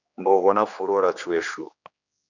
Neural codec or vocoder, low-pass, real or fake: codec, 16 kHz, 2 kbps, X-Codec, HuBERT features, trained on general audio; 7.2 kHz; fake